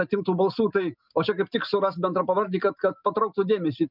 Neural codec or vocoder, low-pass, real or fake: none; 5.4 kHz; real